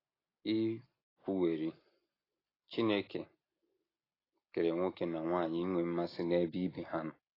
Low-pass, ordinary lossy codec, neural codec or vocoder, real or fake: 5.4 kHz; AAC, 24 kbps; none; real